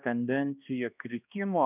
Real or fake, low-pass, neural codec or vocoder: fake; 3.6 kHz; codec, 16 kHz, 1 kbps, X-Codec, HuBERT features, trained on balanced general audio